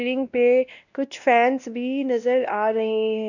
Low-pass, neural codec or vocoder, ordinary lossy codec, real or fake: 7.2 kHz; codec, 16 kHz, 1 kbps, X-Codec, WavLM features, trained on Multilingual LibriSpeech; none; fake